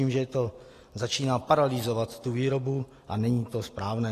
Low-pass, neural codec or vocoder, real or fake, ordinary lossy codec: 14.4 kHz; none; real; AAC, 48 kbps